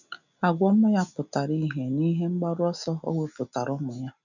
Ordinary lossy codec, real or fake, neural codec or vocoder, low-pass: none; real; none; 7.2 kHz